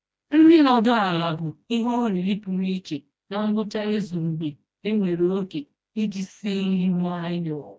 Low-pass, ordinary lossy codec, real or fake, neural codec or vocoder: none; none; fake; codec, 16 kHz, 1 kbps, FreqCodec, smaller model